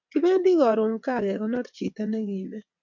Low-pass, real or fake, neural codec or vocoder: 7.2 kHz; fake; vocoder, 22.05 kHz, 80 mel bands, WaveNeXt